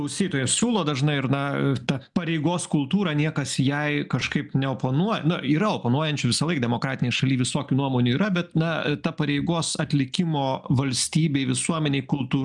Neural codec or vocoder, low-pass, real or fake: none; 10.8 kHz; real